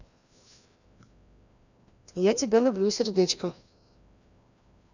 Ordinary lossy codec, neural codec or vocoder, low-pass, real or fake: none; codec, 16 kHz, 1 kbps, FreqCodec, larger model; 7.2 kHz; fake